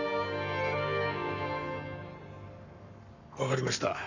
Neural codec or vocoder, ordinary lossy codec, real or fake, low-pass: codec, 44.1 kHz, 7.8 kbps, Pupu-Codec; none; fake; 7.2 kHz